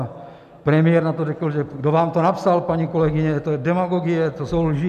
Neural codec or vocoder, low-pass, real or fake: none; 14.4 kHz; real